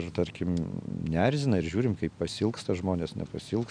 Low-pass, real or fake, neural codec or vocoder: 9.9 kHz; real; none